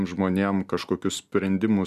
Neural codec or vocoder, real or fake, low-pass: none; real; 14.4 kHz